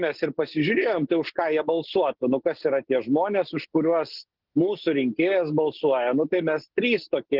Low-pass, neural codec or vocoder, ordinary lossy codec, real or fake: 5.4 kHz; none; Opus, 16 kbps; real